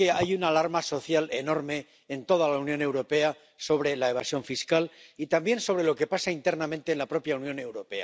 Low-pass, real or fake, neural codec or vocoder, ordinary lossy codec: none; real; none; none